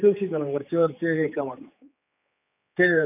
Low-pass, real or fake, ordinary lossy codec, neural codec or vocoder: 3.6 kHz; fake; none; codec, 16 kHz, 4 kbps, X-Codec, HuBERT features, trained on general audio